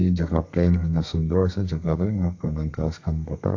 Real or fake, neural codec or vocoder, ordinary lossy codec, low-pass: fake; codec, 32 kHz, 1.9 kbps, SNAC; AAC, 48 kbps; 7.2 kHz